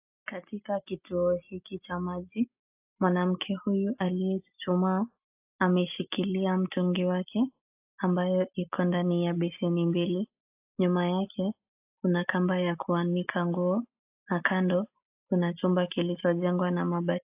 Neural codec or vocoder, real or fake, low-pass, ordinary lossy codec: none; real; 3.6 kHz; AAC, 32 kbps